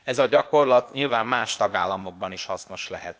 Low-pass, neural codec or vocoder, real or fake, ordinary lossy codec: none; codec, 16 kHz, 0.8 kbps, ZipCodec; fake; none